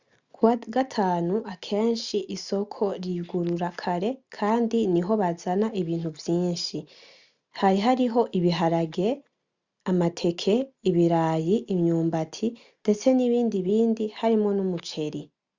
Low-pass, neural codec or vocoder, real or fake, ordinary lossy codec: 7.2 kHz; none; real; Opus, 64 kbps